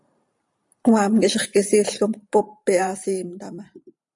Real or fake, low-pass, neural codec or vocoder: fake; 10.8 kHz; vocoder, 44.1 kHz, 128 mel bands every 512 samples, BigVGAN v2